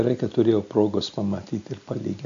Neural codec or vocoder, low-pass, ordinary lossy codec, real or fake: none; 7.2 kHz; MP3, 64 kbps; real